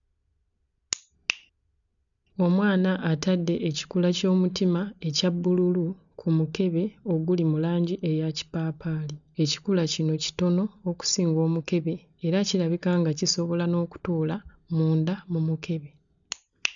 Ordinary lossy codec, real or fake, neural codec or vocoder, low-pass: none; real; none; 7.2 kHz